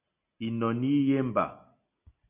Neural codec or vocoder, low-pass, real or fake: none; 3.6 kHz; real